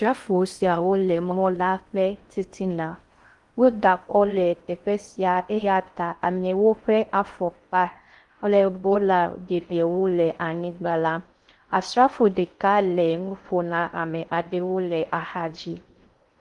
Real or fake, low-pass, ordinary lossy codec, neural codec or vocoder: fake; 10.8 kHz; Opus, 24 kbps; codec, 16 kHz in and 24 kHz out, 0.6 kbps, FocalCodec, streaming, 2048 codes